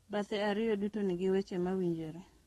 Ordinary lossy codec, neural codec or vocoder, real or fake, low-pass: AAC, 32 kbps; codec, 44.1 kHz, 7.8 kbps, DAC; fake; 19.8 kHz